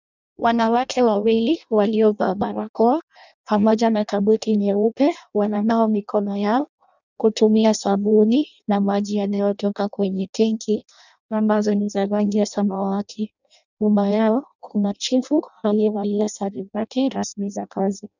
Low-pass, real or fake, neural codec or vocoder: 7.2 kHz; fake; codec, 16 kHz in and 24 kHz out, 0.6 kbps, FireRedTTS-2 codec